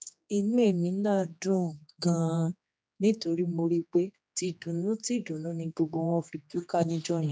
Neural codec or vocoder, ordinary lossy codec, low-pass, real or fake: codec, 16 kHz, 2 kbps, X-Codec, HuBERT features, trained on general audio; none; none; fake